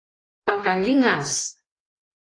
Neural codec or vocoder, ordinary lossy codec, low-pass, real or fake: codec, 16 kHz in and 24 kHz out, 1.1 kbps, FireRedTTS-2 codec; AAC, 32 kbps; 9.9 kHz; fake